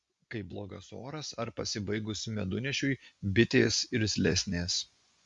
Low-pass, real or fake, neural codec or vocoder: 10.8 kHz; fake; vocoder, 24 kHz, 100 mel bands, Vocos